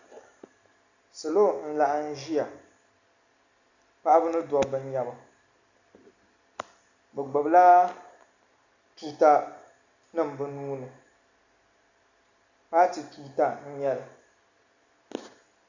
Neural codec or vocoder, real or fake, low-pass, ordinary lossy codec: none; real; 7.2 kHz; Opus, 64 kbps